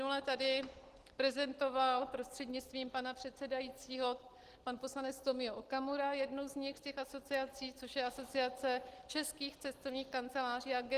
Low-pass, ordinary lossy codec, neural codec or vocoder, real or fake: 14.4 kHz; Opus, 16 kbps; none; real